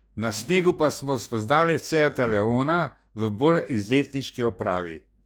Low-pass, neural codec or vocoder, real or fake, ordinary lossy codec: none; codec, 44.1 kHz, 2.6 kbps, DAC; fake; none